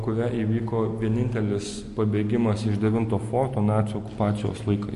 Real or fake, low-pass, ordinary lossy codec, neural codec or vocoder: real; 14.4 kHz; MP3, 48 kbps; none